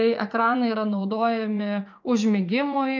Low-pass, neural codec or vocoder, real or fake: 7.2 kHz; vocoder, 44.1 kHz, 80 mel bands, Vocos; fake